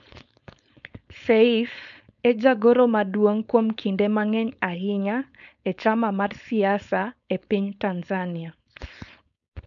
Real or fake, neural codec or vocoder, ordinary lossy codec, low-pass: fake; codec, 16 kHz, 4.8 kbps, FACodec; none; 7.2 kHz